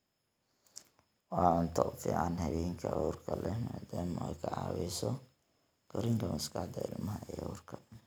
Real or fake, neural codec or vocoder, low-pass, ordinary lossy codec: real; none; none; none